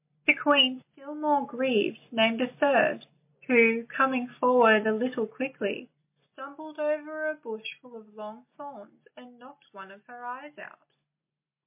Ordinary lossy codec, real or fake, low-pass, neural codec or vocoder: MP3, 24 kbps; real; 3.6 kHz; none